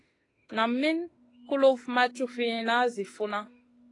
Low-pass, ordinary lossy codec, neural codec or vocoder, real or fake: 10.8 kHz; AAC, 32 kbps; autoencoder, 48 kHz, 32 numbers a frame, DAC-VAE, trained on Japanese speech; fake